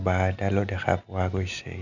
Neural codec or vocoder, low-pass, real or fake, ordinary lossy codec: none; 7.2 kHz; real; none